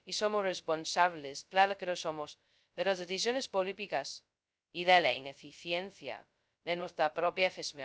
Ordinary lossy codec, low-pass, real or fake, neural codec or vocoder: none; none; fake; codec, 16 kHz, 0.2 kbps, FocalCodec